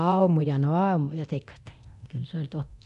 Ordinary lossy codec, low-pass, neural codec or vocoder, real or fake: none; 10.8 kHz; codec, 24 kHz, 0.9 kbps, DualCodec; fake